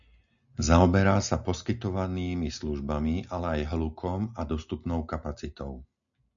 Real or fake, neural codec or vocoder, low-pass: real; none; 7.2 kHz